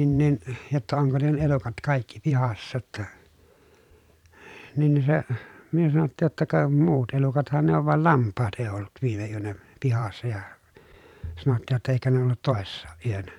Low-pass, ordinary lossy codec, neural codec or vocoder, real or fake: 19.8 kHz; none; vocoder, 44.1 kHz, 128 mel bands every 512 samples, BigVGAN v2; fake